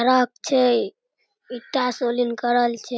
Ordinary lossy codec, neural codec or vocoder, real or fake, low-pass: none; none; real; none